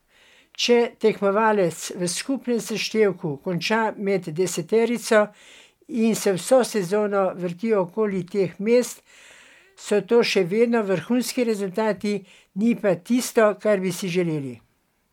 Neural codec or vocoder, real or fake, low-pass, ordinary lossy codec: none; real; 19.8 kHz; MP3, 96 kbps